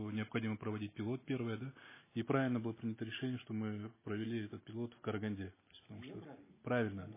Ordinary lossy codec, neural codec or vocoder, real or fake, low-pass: MP3, 16 kbps; none; real; 3.6 kHz